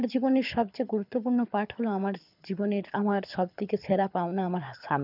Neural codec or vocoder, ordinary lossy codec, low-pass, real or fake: codec, 24 kHz, 6 kbps, HILCodec; none; 5.4 kHz; fake